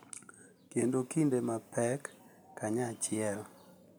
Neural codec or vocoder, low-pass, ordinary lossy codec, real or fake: none; none; none; real